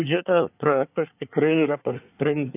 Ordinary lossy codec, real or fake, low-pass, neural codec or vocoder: AAC, 24 kbps; fake; 3.6 kHz; codec, 24 kHz, 1 kbps, SNAC